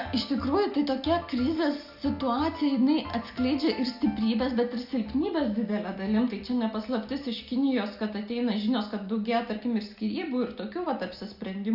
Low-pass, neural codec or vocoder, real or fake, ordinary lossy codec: 5.4 kHz; none; real; Opus, 64 kbps